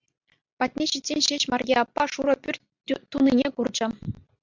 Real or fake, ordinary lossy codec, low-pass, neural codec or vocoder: real; MP3, 64 kbps; 7.2 kHz; none